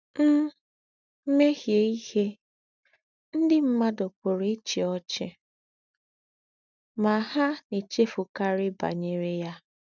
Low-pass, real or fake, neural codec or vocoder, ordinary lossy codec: 7.2 kHz; real; none; none